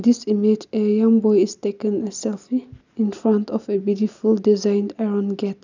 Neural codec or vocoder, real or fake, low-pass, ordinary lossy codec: none; real; 7.2 kHz; none